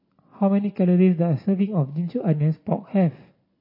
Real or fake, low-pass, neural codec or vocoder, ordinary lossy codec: real; 5.4 kHz; none; MP3, 24 kbps